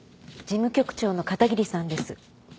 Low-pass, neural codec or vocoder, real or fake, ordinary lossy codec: none; none; real; none